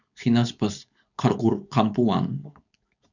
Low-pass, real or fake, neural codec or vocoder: 7.2 kHz; fake; codec, 16 kHz, 4.8 kbps, FACodec